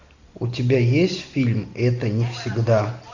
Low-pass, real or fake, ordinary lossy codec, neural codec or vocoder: 7.2 kHz; real; AAC, 48 kbps; none